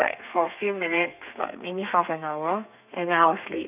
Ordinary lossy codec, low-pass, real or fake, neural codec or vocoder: none; 3.6 kHz; fake; codec, 32 kHz, 1.9 kbps, SNAC